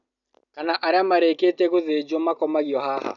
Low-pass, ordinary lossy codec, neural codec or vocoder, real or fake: 7.2 kHz; none; none; real